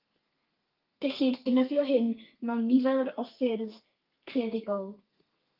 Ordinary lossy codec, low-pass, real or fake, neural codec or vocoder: Opus, 32 kbps; 5.4 kHz; fake; codec, 32 kHz, 1.9 kbps, SNAC